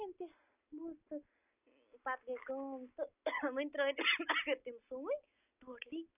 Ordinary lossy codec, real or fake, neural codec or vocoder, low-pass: none; real; none; 3.6 kHz